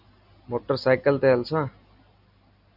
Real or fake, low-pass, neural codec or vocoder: real; 5.4 kHz; none